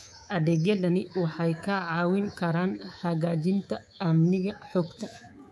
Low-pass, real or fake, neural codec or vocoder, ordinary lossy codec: none; fake; codec, 24 kHz, 3.1 kbps, DualCodec; none